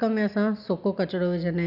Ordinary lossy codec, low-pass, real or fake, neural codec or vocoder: none; 5.4 kHz; real; none